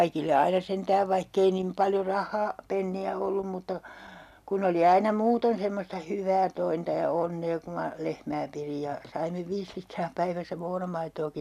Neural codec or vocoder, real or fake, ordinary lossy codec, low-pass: none; real; none; 14.4 kHz